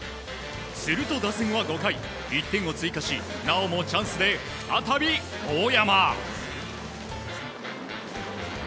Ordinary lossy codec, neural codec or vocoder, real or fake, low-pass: none; none; real; none